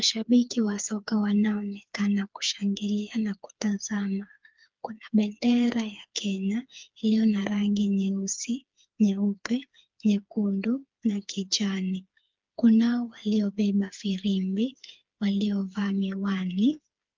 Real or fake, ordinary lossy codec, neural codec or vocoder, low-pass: fake; Opus, 32 kbps; codec, 16 kHz, 4 kbps, FreqCodec, smaller model; 7.2 kHz